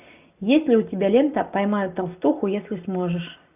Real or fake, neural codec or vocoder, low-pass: real; none; 3.6 kHz